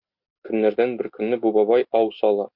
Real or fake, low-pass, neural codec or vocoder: real; 5.4 kHz; none